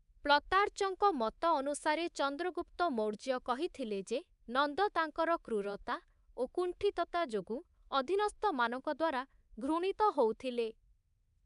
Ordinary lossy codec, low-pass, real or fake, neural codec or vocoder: none; 10.8 kHz; fake; codec, 24 kHz, 3.1 kbps, DualCodec